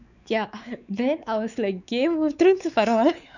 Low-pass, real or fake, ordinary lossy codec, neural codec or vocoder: 7.2 kHz; fake; none; codec, 16 kHz, 4 kbps, X-Codec, WavLM features, trained on Multilingual LibriSpeech